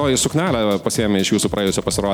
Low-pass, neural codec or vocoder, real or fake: 19.8 kHz; none; real